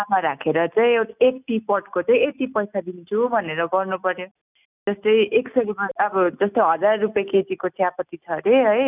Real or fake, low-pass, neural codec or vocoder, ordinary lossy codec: real; 3.6 kHz; none; none